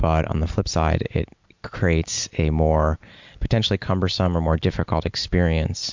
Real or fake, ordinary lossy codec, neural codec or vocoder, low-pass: real; MP3, 64 kbps; none; 7.2 kHz